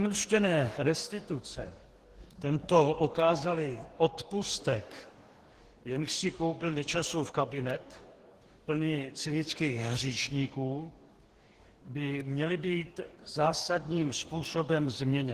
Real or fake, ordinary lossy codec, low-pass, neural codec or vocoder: fake; Opus, 16 kbps; 14.4 kHz; codec, 44.1 kHz, 2.6 kbps, DAC